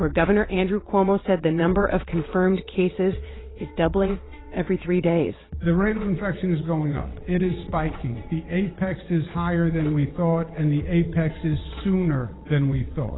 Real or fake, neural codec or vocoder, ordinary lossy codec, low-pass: fake; vocoder, 22.05 kHz, 80 mel bands, WaveNeXt; AAC, 16 kbps; 7.2 kHz